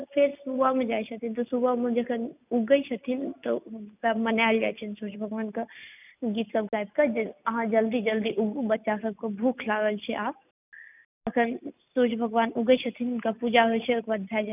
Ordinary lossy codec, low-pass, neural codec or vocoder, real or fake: none; 3.6 kHz; none; real